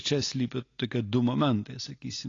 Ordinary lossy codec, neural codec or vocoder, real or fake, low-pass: AAC, 32 kbps; none; real; 7.2 kHz